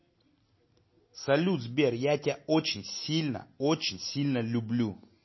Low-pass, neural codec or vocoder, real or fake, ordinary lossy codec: 7.2 kHz; none; real; MP3, 24 kbps